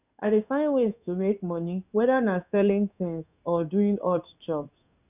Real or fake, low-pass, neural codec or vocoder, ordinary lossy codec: fake; 3.6 kHz; codec, 16 kHz in and 24 kHz out, 1 kbps, XY-Tokenizer; none